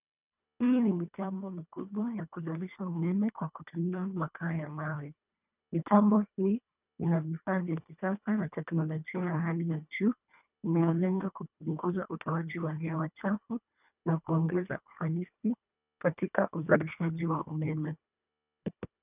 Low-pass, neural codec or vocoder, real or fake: 3.6 kHz; codec, 24 kHz, 1.5 kbps, HILCodec; fake